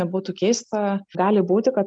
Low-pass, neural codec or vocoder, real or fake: 9.9 kHz; none; real